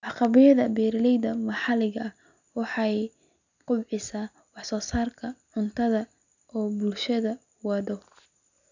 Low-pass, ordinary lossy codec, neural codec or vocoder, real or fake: 7.2 kHz; none; none; real